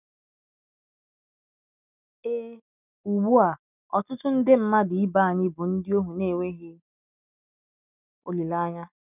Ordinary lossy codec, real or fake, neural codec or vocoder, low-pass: none; real; none; 3.6 kHz